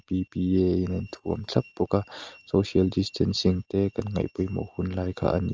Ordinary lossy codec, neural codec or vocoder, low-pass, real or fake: Opus, 24 kbps; none; 7.2 kHz; real